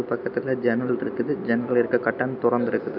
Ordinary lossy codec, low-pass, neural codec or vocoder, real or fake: none; 5.4 kHz; none; real